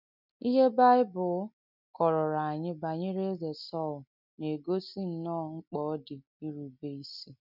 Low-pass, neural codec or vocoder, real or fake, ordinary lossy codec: 5.4 kHz; none; real; none